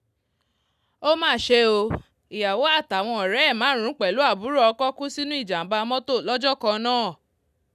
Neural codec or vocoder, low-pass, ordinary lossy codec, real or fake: none; 14.4 kHz; none; real